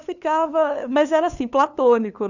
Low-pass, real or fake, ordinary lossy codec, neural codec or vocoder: 7.2 kHz; fake; none; codec, 16 kHz, 2 kbps, FunCodec, trained on LibriTTS, 25 frames a second